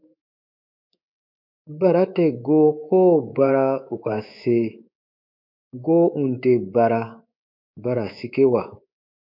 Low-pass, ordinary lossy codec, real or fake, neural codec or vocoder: 5.4 kHz; MP3, 48 kbps; fake; codec, 24 kHz, 3.1 kbps, DualCodec